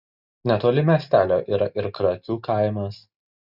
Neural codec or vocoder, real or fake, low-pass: none; real; 5.4 kHz